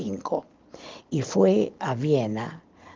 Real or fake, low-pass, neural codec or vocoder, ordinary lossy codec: real; 7.2 kHz; none; Opus, 32 kbps